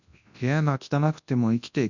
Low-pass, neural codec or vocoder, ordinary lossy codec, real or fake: 7.2 kHz; codec, 24 kHz, 0.9 kbps, WavTokenizer, large speech release; none; fake